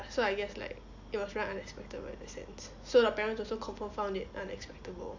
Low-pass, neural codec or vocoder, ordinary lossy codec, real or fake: 7.2 kHz; none; AAC, 48 kbps; real